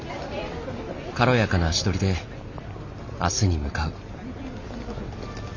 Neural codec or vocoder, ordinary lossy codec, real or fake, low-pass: none; none; real; 7.2 kHz